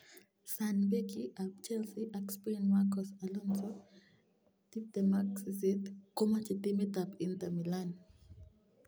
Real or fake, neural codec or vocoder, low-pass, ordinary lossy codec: real; none; none; none